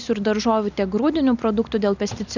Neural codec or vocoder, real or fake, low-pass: none; real; 7.2 kHz